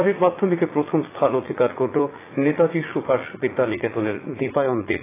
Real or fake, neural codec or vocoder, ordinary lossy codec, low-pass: fake; vocoder, 44.1 kHz, 80 mel bands, Vocos; AAC, 16 kbps; 3.6 kHz